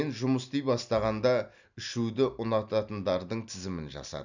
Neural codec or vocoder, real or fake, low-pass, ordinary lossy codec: none; real; 7.2 kHz; none